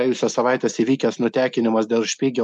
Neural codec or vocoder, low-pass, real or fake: none; 10.8 kHz; real